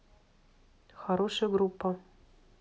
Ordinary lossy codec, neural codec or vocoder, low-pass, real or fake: none; none; none; real